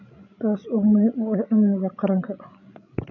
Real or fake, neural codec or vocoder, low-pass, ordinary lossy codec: fake; codec, 16 kHz, 16 kbps, FreqCodec, larger model; 7.2 kHz; none